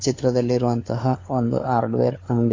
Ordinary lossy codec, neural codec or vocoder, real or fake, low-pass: AAC, 32 kbps; codec, 16 kHz, 4 kbps, FunCodec, trained on LibriTTS, 50 frames a second; fake; 7.2 kHz